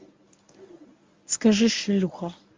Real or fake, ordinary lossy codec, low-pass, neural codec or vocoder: fake; Opus, 32 kbps; 7.2 kHz; codec, 24 kHz, 0.9 kbps, WavTokenizer, medium speech release version 1